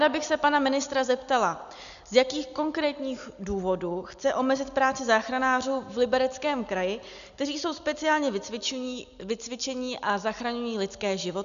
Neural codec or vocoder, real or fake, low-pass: none; real; 7.2 kHz